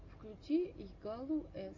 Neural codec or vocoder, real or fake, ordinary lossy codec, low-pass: none; real; AAC, 48 kbps; 7.2 kHz